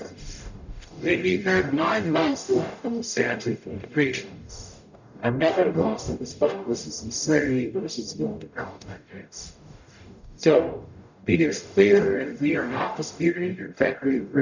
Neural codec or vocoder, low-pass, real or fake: codec, 44.1 kHz, 0.9 kbps, DAC; 7.2 kHz; fake